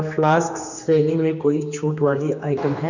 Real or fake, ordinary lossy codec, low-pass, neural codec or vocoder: fake; none; 7.2 kHz; codec, 16 kHz, 2 kbps, X-Codec, HuBERT features, trained on general audio